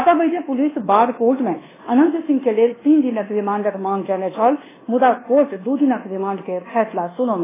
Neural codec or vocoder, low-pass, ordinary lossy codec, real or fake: codec, 24 kHz, 1.2 kbps, DualCodec; 3.6 kHz; AAC, 16 kbps; fake